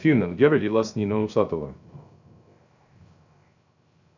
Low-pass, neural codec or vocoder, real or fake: 7.2 kHz; codec, 16 kHz, 0.3 kbps, FocalCodec; fake